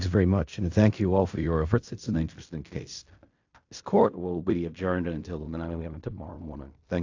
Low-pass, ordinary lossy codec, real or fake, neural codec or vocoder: 7.2 kHz; AAC, 48 kbps; fake; codec, 16 kHz in and 24 kHz out, 0.4 kbps, LongCat-Audio-Codec, fine tuned four codebook decoder